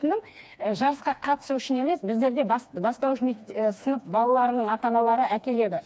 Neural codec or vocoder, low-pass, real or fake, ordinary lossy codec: codec, 16 kHz, 2 kbps, FreqCodec, smaller model; none; fake; none